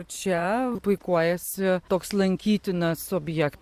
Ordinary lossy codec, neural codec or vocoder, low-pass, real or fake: Opus, 64 kbps; vocoder, 44.1 kHz, 128 mel bands every 512 samples, BigVGAN v2; 14.4 kHz; fake